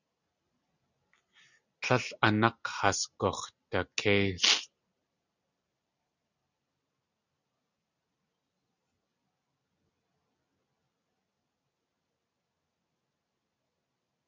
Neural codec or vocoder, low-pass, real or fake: none; 7.2 kHz; real